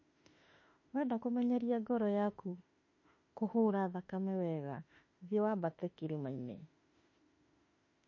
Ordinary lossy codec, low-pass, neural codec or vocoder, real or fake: MP3, 32 kbps; 7.2 kHz; autoencoder, 48 kHz, 32 numbers a frame, DAC-VAE, trained on Japanese speech; fake